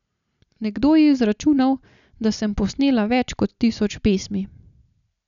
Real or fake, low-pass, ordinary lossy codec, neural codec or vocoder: real; 7.2 kHz; none; none